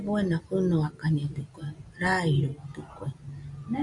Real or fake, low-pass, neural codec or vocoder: real; 10.8 kHz; none